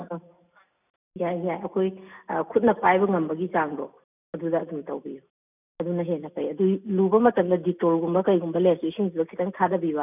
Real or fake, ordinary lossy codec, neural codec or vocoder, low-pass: real; none; none; 3.6 kHz